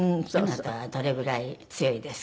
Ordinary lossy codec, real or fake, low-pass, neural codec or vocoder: none; real; none; none